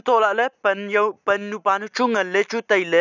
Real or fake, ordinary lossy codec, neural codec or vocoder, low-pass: real; none; none; 7.2 kHz